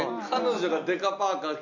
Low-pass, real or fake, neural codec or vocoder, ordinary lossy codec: 7.2 kHz; real; none; none